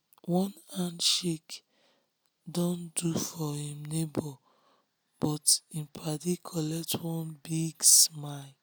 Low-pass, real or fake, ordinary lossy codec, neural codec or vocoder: none; real; none; none